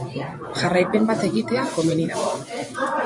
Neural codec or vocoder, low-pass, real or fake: vocoder, 24 kHz, 100 mel bands, Vocos; 10.8 kHz; fake